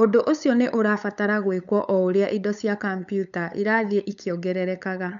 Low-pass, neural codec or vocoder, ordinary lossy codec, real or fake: 7.2 kHz; codec, 16 kHz, 8 kbps, FunCodec, trained on LibriTTS, 25 frames a second; none; fake